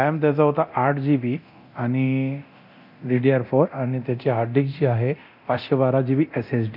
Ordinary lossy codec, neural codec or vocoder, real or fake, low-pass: none; codec, 24 kHz, 0.9 kbps, DualCodec; fake; 5.4 kHz